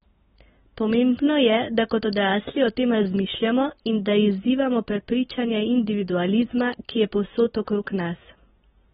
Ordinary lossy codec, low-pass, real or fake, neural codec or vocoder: AAC, 16 kbps; 19.8 kHz; real; none